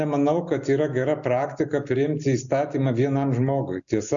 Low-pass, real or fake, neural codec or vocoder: 7.2 kHz; real; none